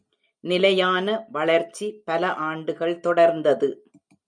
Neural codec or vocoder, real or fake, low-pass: none; real; 9.9 kHz